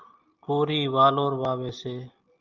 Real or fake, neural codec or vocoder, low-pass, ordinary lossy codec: real; none; 7.2 kHz; Opus, 24 kbps